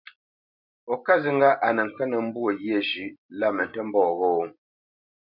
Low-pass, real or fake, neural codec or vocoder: 5.4 kHz; real; none